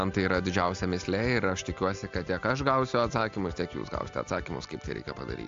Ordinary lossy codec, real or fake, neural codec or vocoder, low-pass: AAC, 64 kbps; real; none; 7.2 kHz